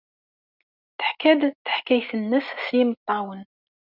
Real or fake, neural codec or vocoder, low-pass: real; none; 5.4 kHz